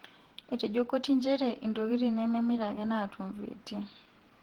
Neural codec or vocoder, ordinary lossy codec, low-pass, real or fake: vocoder, 48 kHz, 128 mel bands, Vocos; Opus, 16 kbps; 19.8 kHz; fake